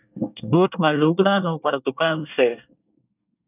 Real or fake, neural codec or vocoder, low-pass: fake; codec, 24 kHz, 1 kbps, SNAC; 3.6 kHz